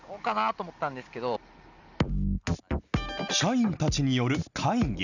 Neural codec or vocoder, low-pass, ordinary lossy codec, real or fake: none; 7.2 kHz; none; real